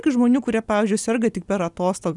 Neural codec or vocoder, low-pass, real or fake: none; 10.8 kHz; real